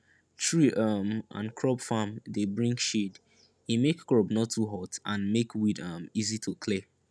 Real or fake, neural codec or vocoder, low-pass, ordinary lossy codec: real; none; none; none